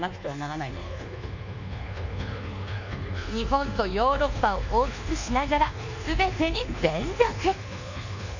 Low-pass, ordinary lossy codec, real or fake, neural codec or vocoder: 7.2 kHz; none; fake; codec, 24 kHz, 1.2 kbps, DualCodec